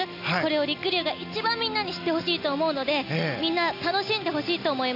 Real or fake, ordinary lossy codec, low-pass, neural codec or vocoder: real; none; 5.4 kHz; none